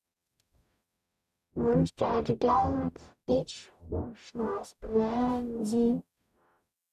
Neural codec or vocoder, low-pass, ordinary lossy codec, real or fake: codec, 44.1 kHz, 0.9 kbps, DAC; 14.4 kHz; none; fake